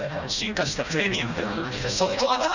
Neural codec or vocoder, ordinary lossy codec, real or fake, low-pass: codec, 16 kHz, 1 kbps, FreqCodec, smaller model; none; fake; 7.2 kHz